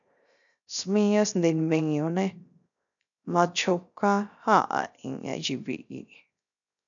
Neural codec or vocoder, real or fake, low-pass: codec, 16 kHz, 0.3 kbps, FocalCodec; fake; 7.2 kHz